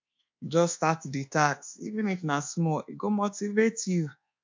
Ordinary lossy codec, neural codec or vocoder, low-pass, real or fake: MP3, 64 kbps; codec, 24 kHz, 1.2 kbps, DualCodec; 7.2 kHz; fake